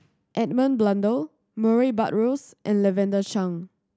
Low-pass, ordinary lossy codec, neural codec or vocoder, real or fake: none; none; none; real